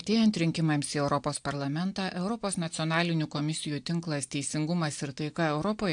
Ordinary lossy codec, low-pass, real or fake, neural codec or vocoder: AAC, 64 kbps; 9.9 kHz; real; none